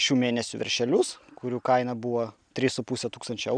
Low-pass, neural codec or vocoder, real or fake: 9.9 kHz; none; real